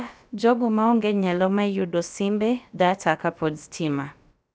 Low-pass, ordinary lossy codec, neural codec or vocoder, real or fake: none; none; codec, 16 kHz, about 1 kbps, DyCAST, with the encoder's durations; fake